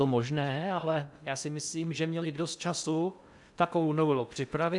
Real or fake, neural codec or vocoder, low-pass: fake; codec, 16 kHz in and 24 kHz out, 0.6 kbps, FocalCodec, streaming, 2048 codes; 10.8 kHz